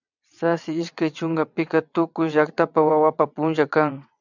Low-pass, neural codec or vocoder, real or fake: 7.2 kHz; vocoder, 22.05 kHz, 80 mel bands, WaveNeXt; fake